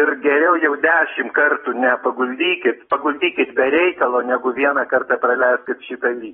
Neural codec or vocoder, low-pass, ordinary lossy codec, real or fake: vocoder, 44.1 kHz, 128 mel bands every 256 samples, BigVGAN v2; 19.8 kHz; AAC, 16 kbps; fake